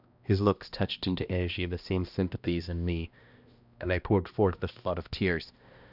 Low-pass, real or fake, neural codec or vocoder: 5.4 kHz; fake; codec, 16 kHz, 1 kbps, X-Codec, HuBERT features, trained on balanced general audio